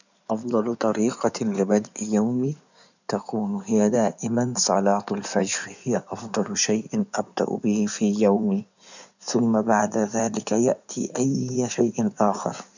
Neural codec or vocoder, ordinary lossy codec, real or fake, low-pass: codec, 16 kHz in and 24 kHz out, 2.2 kbps, FireRedTTS-2 codec; none; fake; 7.2 kHz